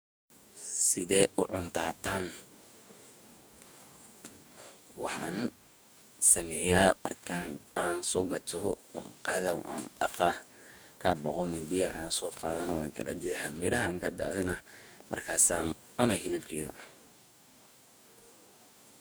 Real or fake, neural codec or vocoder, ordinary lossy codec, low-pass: fake; codec, 44.1 kHz, 2.6 kbps, DAC; none; none